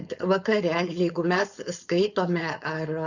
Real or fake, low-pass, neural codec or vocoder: fake; 7.2 kHz; codec, 16 kHz, 4.8 kbps, FACodec